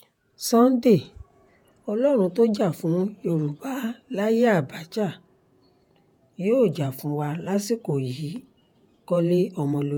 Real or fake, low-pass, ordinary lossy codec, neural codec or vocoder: fake; none; none; vocoder, 48 kHz, 128 mel bands, Vocos